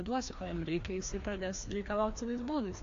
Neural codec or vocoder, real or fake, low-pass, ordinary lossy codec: codec, 16 kHz, 2 kbps, FreqCodec, larger model; fake; 7.2 kHz; AAC, 48 kbps